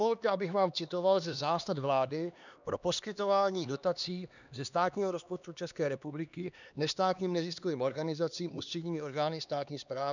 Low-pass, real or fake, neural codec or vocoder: 7.2 kHz; fake; codec, 16 kHz, 2 kbps, X-Codec, HuBERT features, trained on LibriSpeech